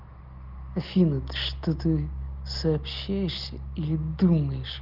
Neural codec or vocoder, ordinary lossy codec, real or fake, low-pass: none; Opus, 32 kbps; real; 5.4 kHz